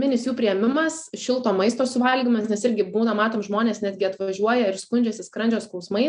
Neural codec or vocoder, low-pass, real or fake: none; 9.9 kHz; real